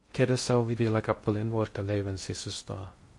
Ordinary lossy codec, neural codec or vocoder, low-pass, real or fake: MP3, 48 kbps; codec, 16 kHz in and 24 kHz out, 0.6 kbps, FocalCodec, streaming, 2048 codes; 10.8 kHz; fake